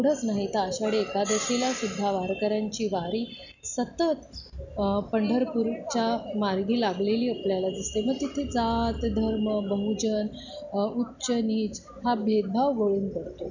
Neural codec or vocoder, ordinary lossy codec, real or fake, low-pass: none; none; real; 7.2 kHz